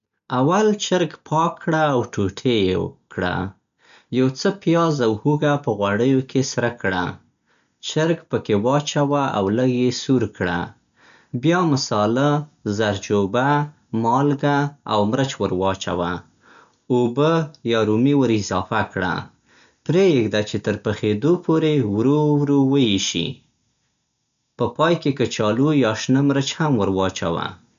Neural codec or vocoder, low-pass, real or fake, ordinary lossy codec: none; 7.2 kHz; real; none